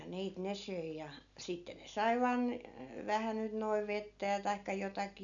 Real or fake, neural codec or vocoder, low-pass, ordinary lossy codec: real; none; 7.2 kHz; none